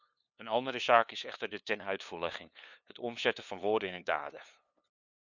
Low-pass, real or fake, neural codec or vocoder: 7.2 kHz; fake; codec, 16 kHz, 2 kbps, FunCodec, trained on LibriTTS, 25 frames a second